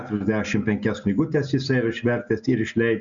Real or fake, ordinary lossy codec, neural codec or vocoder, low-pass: real; Opus, 64 kbps; none; 7.2 kHz